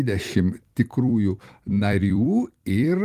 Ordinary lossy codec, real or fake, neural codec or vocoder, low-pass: Opus, 24 kbps; fake; vocoder, 44.1 kHz, 128 mel bands every 256 samples, BigVGAN v2; 14.4 kHz